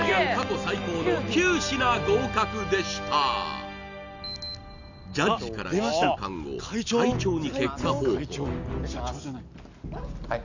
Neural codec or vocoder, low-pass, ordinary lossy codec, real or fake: none; 7.2 kHz; none; real